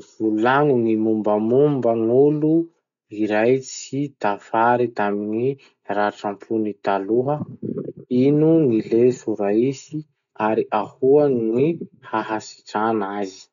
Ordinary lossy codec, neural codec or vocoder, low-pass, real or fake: none; none; 7.2 kHz; real